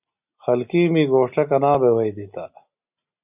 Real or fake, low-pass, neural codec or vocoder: real; 3.6 kHz; none